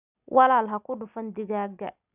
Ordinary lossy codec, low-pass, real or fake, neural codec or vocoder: none; 3.6 kHz; real; none